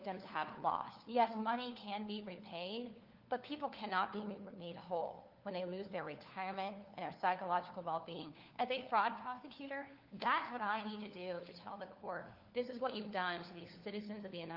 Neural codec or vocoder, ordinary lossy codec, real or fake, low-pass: codec, 16 kHz, 4 kbps, FunCodec, trained on LibriTTS, 50 frames a second; Opus, 32 kbps; fake; 5.4 kHz